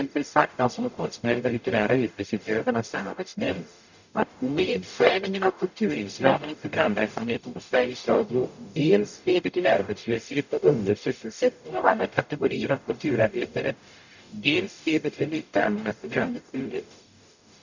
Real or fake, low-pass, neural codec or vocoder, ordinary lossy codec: fake; 7.2 kHz; codec, 44.1 kHz, 0.9 kbps, DAC; none